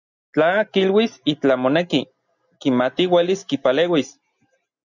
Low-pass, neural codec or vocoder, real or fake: 7.2 kHz; none; real